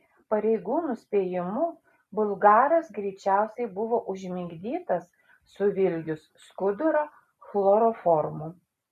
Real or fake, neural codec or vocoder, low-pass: real; none; 14.4 kHz